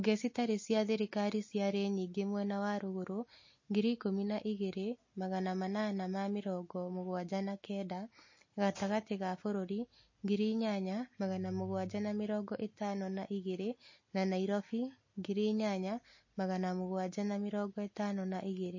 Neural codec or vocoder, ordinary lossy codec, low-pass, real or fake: none; MP3, 32 kbps; 7.2 kHz; real